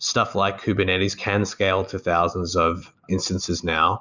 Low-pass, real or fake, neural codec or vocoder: 7.2 kHz; real; none